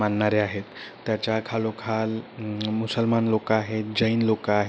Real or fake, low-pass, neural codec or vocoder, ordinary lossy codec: real; none; none; none